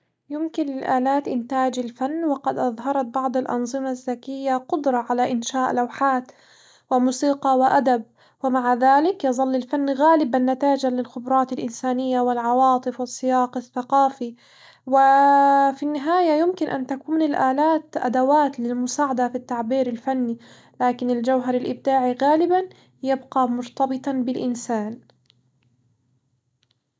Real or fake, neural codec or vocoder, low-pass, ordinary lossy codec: real; none; none; none